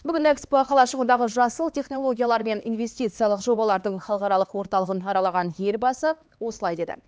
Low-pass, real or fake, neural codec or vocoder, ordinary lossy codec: none; fake; codec, 16 kHz, 2 kbps, X-Codec, HuBERT features, trained on LibriSpeech; none